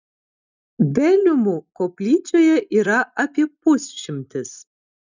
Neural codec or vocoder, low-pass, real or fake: none; 7.2 kHz; real